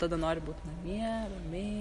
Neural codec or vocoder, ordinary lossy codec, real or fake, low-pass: none; MP3, 48 kbps; real; 14.4 kHz